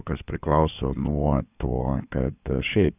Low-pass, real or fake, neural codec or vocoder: 3.6 kHz; fake; codec, 16 kHz in and 24 kHz out, 2.2 kbps, FireRedTTS-2 codec